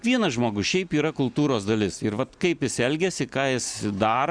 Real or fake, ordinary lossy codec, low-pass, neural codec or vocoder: real; Opus, 64 kbps; 9.9 kHz; none